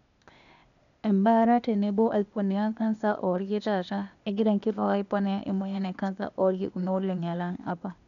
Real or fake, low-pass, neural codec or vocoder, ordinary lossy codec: fake; 7.2 kHz; codec, 16 kHz, 0.8 kbps, ZipCodec; none